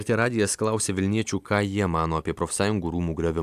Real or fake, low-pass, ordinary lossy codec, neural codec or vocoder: real; 14.4 kHz; AAC, 96 kbps; none